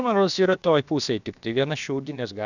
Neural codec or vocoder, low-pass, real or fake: codec, 16 kHz, about 1 kbps, DyCAST, with the encoder's durations; 7.2 kHz; fake